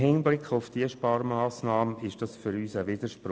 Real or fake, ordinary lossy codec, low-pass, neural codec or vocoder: real; none; none; none